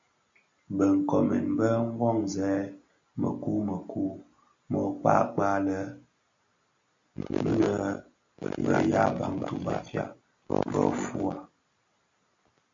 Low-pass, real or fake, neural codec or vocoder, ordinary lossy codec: 7.2 kHz; real; none; MP3, 48 kbps